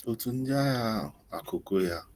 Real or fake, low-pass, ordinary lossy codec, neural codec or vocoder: real; 14.4 kHz; Opus, 24 kbps; none